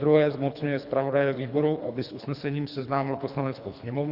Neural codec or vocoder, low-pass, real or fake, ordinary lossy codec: codec, 24 kHz, 3 kbps, HILCodec; 5.4 kHz; fake; Opus, 64 kbps